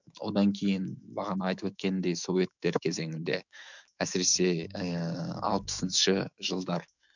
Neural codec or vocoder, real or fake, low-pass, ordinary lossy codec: autoencoder, 48 kHz, 128 numbers a frame, DAC-VAE, trained on Japanese speech; fake; 7.2 kHz; none